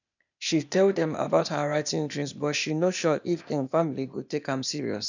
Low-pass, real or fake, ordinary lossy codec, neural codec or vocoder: 7.2 kHz; fake; none; codec, 16 kHz, 0.8 kbps, ZipCodec